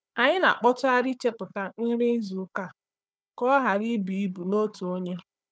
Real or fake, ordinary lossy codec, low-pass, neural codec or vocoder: fake; none; none; codec, 16 kHz, 4 kbps, FunCodec, trained on Chinese and English, 50 frames a second